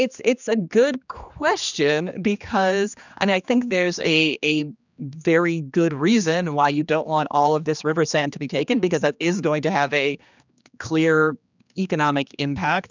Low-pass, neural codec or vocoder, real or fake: 7.2 kHz; codec, 16 kHz, 2 kbps, X-Codec, HuBERT features, trained on general audio; fake